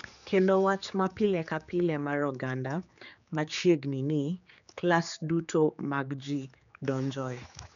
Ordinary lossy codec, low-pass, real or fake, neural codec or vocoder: none; 7.2 kHz; fake; codec, 16 kHz, 4 kbps, X-Codec, HuBERT features, trained on general audio